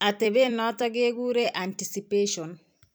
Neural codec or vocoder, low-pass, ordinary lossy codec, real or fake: vocoder, 44.1 kHz, 128 mel bands every 256 samples, BigVGAN v2; none; none; fake